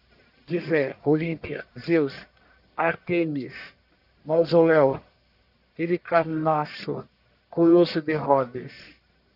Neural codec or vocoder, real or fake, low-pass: codec, 44.1 kHz, 1.7 kbps, Pupu-Codec; fake; 5.4 kHz